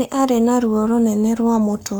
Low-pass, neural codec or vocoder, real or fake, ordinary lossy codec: none; codec, 44.1 kHz, 7.8 kbps, Pupu-Codec; fake; none